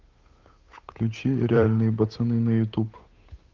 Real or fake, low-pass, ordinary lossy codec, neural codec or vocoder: fake; 7.2 kHz; Opus, 24 kbps; codec, 16 kHz, 8 kbps, FunCodec, trained on Chinese and English, 25 frames a second